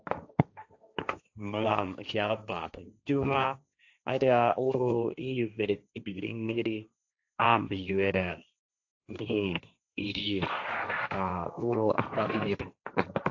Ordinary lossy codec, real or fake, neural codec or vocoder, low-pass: AAC, 48 kbps; fake; codec, 16 kHz, 1.1 kbps, Voila-Tokenizer; 7.2 kHz